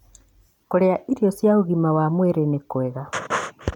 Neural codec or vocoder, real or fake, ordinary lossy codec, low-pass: none; real; none; 19.8 kHz